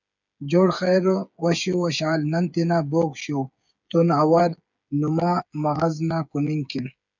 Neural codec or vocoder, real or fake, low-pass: codec, 16 kHz, 8 kbps, FreqCodec, smaller model; fake; 7.2 kHz